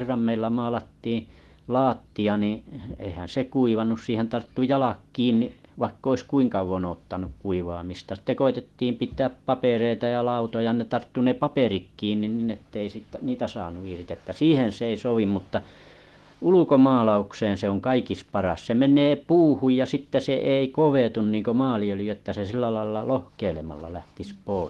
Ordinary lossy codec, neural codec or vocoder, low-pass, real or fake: Opus, 16 kbps; autoencoder, 48 kHz, 128 numbers a frame, DAC-VAE, trained on Japanese speech; 19.8 kHz; fake